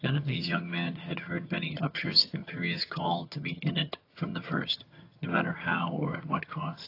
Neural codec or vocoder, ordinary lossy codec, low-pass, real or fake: vocoder, 22.05 kHz, 80 mel bands, HiFi-GAN; AAC, 32 kbps; 5.4 kHz; fake